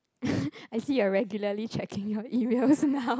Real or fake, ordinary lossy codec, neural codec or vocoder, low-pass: real; none; none; none